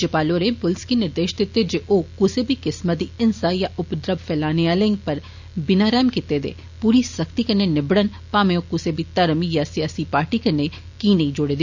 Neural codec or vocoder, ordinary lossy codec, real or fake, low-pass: none; none; real; 7.2 kHz